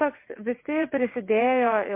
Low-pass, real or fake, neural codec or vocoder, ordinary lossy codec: 3.6 kHz; fake; vocoder, 22.05 kHz, 80 mel bands, WaveNeXt; MP3, 24 kbps